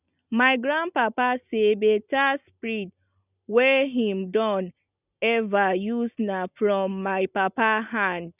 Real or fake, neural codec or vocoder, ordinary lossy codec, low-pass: real; none; none; 3.6 kHz